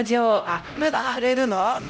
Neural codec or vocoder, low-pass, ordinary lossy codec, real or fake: codec, 16 kHz, 0.5 kbps, X-Codec, HuBERT features, trained on LibriSpeech; none; none; fake